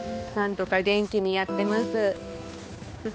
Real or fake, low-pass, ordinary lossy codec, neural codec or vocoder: fake; none; none; codec, 16 kHz, 2 kbps, X-Codec, HuBERT features, trained on balanced general audio